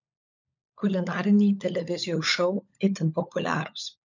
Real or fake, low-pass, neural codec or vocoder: fake; 7.2 kHz; codec, 16 kHz, 16 kbps, FunCodec, trained on LibriTTS, 50 frames a second